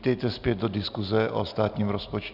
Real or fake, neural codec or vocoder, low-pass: real; none; 5.4 kHz